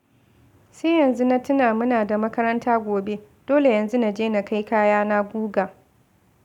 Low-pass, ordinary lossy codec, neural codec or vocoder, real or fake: 19.8 kHz; none; none; real